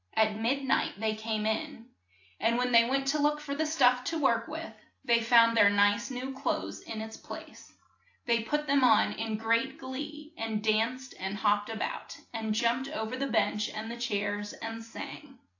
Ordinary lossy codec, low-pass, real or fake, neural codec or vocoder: AAC, 48 kbps; 7.2 kHz; real; none